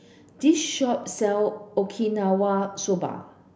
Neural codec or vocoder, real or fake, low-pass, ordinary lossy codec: none; real; none; none